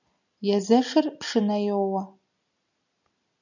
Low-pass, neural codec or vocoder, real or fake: 7.2 kHz; none; real